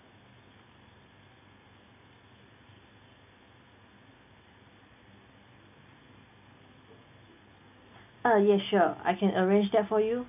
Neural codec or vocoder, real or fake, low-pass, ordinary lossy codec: none; real; 3.6 kHz; AAC, 32 kbps